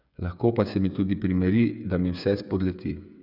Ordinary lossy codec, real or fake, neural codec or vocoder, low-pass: none; fake; codec, 16 kHz, 8 kbps, FreqCodec, smaller model; 5.4 kHz